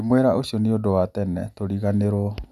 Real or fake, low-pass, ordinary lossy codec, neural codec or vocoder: real; 14.4 kHz; none; none